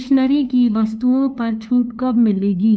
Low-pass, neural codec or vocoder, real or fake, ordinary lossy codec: none; codec, 16 kHz, 2 kbps, FunCodec, trained on LibriTTS, 25 frames a second; fake; none